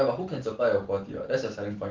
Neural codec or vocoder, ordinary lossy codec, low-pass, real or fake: none; Opus, 16 kbps; 7.2 kHz; real